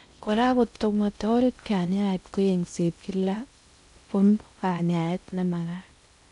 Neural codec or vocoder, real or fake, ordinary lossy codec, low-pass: codec, 16 kHz in and 24 kHz out, 0.6 kbps, FocalCodec, streaming, 4096 codes; fake; none; 10.8 kHz